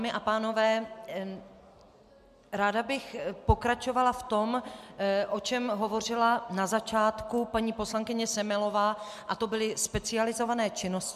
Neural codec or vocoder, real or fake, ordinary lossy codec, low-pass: none; real; MP3, 96 kbps; 14.4 kHz